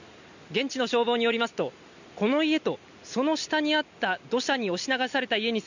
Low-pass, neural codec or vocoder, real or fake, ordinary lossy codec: 7.2 kHz; none; real; none